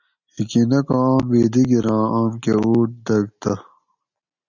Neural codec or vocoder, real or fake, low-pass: none; real; 7.2 kHz